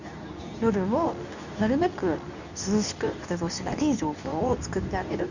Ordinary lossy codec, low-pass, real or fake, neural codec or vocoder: none; 7.2 kHz; fake; codec, 24 kHz, 0.9 kbps, WavTokenizer, medium speech release version 2